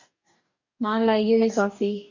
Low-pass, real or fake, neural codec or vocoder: 7.2 kHz; fake; codec, 44.1 kHz, 2.6 kbps, DAC